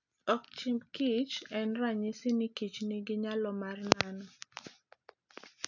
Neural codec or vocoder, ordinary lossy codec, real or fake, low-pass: none; none; real; 7.2 kHz